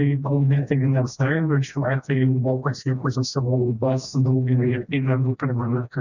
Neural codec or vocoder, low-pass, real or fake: codec, 16 kHz, 1 kbps, FreqCodec, smaller model; 7.2 kHz; fake